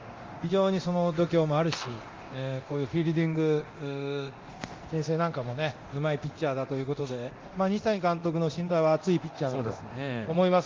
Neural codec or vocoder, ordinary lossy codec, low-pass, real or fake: codec, 24 kHz, 0.9 kbps, DualCodec; Opus, 32 kbps; 7.2 kHz; fake